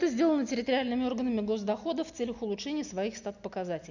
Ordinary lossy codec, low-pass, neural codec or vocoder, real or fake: none; 7.2 kHz; none; real